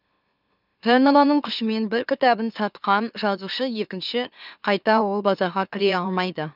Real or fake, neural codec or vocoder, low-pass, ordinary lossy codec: fake; autoencoder, 44.1 kHz, a latent of 192 numbers a frame, MeloTTS; 5.4 kHz; none